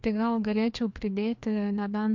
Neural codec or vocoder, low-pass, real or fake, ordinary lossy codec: codec, 16 kHz, 2 kbps, FreqCodec, larger model; 7.2 kHz; fake; MP3, 48 kbps